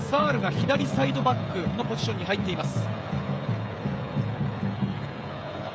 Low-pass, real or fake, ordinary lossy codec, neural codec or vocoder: none; fake; none; codec, 16 kHz, 16 kbps, FreqCodec, smaller model